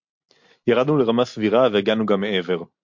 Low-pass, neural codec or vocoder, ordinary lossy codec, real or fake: 7.2 kHz; none; MP3, 48 kbps; real